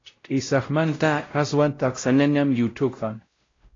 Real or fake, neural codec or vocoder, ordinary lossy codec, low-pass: fake; codec, 16 kHz, 0.5 kbps, X-Codec, WavLM features, trained on Multilingual LibriSpeech; AAC, 32 kbps; 7.2 kHz